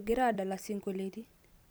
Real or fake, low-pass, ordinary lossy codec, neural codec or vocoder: fake; none; none; vocoder, 44.1 kHz, 128 mel bands every 256 samples, BigVGAN v2